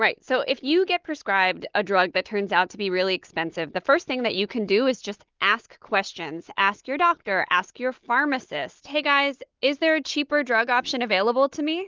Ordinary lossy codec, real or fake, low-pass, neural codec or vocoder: Opus, 32 kbps; real; 7.2 kHz; none